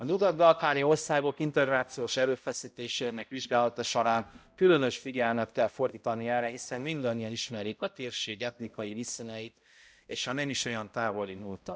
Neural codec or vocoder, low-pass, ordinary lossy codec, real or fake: codec, 16 kHz, 0.5 kbps, X-Codec, HuBERT features, trained on balanced general audio; none; none; fake